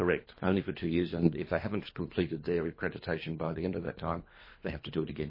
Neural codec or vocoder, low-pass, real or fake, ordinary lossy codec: codec, 24 kHz, 3 kbps, HILCodec; 5.4 kHz; fake; MP3, 24 kbps